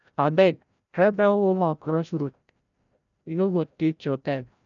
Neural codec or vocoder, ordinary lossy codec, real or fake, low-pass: codec, 16 kHz, 0.5 kbps, FreqCodec, larger model; none; fake; 7.2 kHz